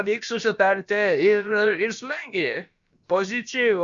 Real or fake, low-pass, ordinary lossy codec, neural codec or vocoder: fake; 7.2 kHz; Opus, 64 kbps; codec, 16 kHz, about 1 kbps, DyCAST, with the encoder's durations